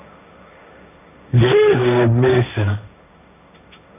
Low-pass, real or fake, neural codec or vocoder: 3.6 kHz; fake; codec, 16 kHz, 1.1 kbps, Voila-Tokenizer